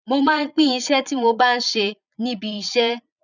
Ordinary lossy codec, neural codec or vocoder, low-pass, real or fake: none; vocoder, 22.05 kHz, 80 mel bands, Vocos; 7.2 kHz; fake